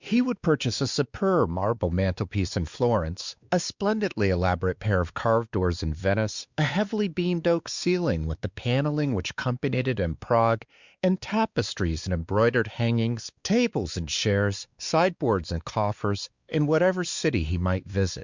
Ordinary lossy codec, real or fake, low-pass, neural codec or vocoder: Opus, 64 kbps; fake; 7.2 kHz; codec, 16 kHz, 2 kbps, X-Codec, WavLM features, trained on Multilingual LibriSpeech